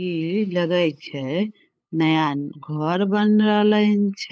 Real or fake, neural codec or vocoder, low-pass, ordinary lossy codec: fake; codec, 16 kHz, 8 kbps, FunCodec, trained on LibriTTS, 25 frames a second; none; none